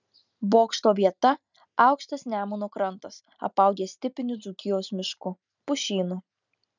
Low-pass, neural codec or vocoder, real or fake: 7.2 kHz; none; real